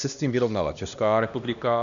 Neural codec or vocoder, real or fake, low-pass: codec, 16 kHz, 1 kbps, X-Codec, HuBERT features, trained on LibriSpeech; fake; 7.2 kHz